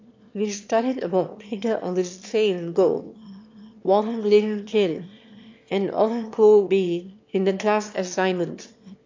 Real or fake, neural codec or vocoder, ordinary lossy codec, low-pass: fake; autoencoder, 22.05 kHz, a latent of 192 numbers a frame, VITS, trained on one speaker; AAC, 48 kbps; 7.2 kHz